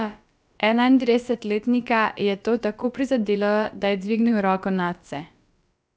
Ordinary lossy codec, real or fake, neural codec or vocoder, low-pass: none; fake; codec, 16 kHz, about 1 kbps, DyCAST, with the encoder's durations; none